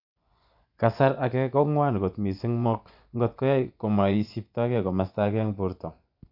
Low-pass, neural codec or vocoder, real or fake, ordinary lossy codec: 5.4 kHz; vocoder, 24 kHz, 100 mel bands, Vocos; fake; none